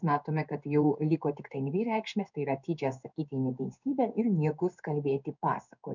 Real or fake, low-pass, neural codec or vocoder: fake; 7.2 kHz; codec, 16 kHz in and 24 kHz out, 1 kbps, XY-Tokenizer